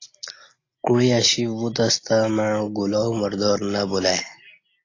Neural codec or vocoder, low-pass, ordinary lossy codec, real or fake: none; 7.2 kHz; AAC, 32 kbps; real